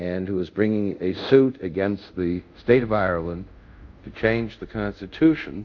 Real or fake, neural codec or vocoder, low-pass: fake; codec, 24 kHz, 0.5 kbps, DualCodec; 7.2 kHz